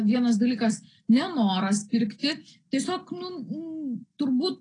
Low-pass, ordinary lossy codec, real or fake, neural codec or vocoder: 9.9 kHz; AAC, 32 kbps; real; none